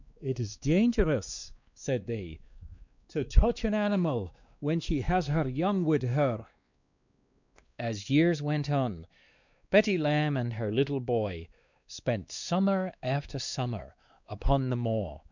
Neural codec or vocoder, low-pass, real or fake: codec, 16 kHz, 2 kbps, X-Codec, WavLM features, trained on Multilingual LibriSpeech; 7.2 kHz; fake